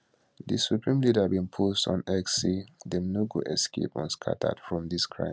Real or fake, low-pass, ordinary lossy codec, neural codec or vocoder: real; none; none; none